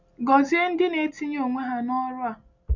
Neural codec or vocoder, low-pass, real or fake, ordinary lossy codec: none; 7.2 kHz; real; none